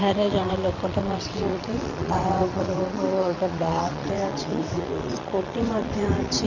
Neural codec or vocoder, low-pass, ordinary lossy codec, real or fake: vocoder, 22.05 kHz, 80 mel bands, WaveNeXt; 7.2 kHz; none; fake